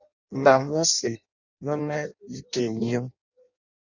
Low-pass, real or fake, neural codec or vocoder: 7.2 kHz; fake; codec, 16 kHz in and 24 kHz out, 0.6 kbps, FireRedTTS-2 codec